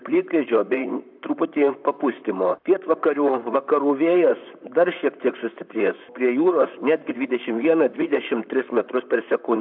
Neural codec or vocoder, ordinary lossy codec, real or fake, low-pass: vocoder, 44.1 kHz, 128 mel bands, Pupu-Vocoder; AAC, 48 kbps; fake; 5.4 kHz